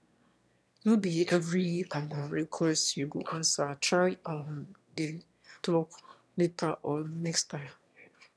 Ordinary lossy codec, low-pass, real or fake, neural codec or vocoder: none; none; fake; autoencoder, 22.05 kHz, a latent of 192 numbers a frame, VITS, trained on one speaker